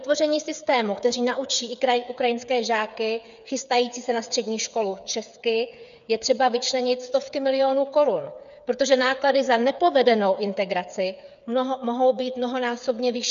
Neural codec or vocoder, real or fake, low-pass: codec, 16 kHz, 8 kbps, FreqCodec, smaller model; fake; 7.2 kHz